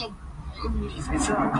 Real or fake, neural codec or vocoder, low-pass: real; none; 10.8 kHz